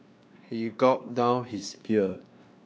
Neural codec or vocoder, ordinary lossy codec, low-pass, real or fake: codec, 16 kHz, 2 kbps, X-Codec, WavLM features, trained on Multilingual LibriSpeech; none; none; fake